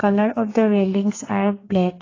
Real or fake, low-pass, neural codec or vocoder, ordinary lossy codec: fake; 7.2 kHz; codec, 32 kHz, 1.9 kbps, SNAC; AAC, 32 kbps